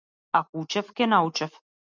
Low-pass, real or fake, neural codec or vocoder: 7.2 kHz; real; none